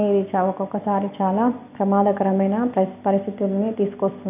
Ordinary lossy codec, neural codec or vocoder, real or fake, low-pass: none; none; real; 3.6 kHz